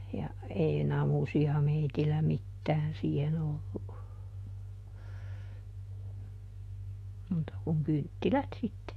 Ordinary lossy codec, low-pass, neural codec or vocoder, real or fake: AAC, 48 kbps; 14.4 kHz; autoencoder, 48 kHz, 128 numbers a frame, DAC-VAE, trained on Japanese speech; fake